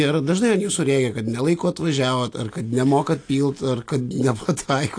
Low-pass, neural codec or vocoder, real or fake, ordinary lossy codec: 9.9 kHz; none; real; AAC, 48 kbps